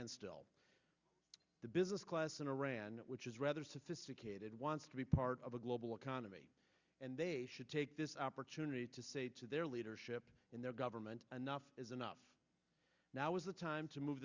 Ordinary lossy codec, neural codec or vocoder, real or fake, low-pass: Opus, 64 kbps; none; real; 7.2 kHz